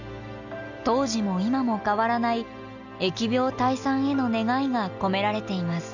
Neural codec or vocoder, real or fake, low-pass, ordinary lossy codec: none; real; 7.2 kHz; none